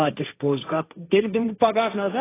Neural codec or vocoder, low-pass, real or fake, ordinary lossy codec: codec, 16 kHz, 1.1 kbps, Voila-Tokenizer; 3.6 kHz; fake; AAC, 16 kbps